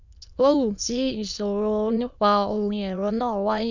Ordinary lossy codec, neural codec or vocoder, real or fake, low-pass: none; autoencoder, 22.05 kHz, a latent of 192 numbers a frame, VITS, trained on many speakers; fake; 7.2 kHz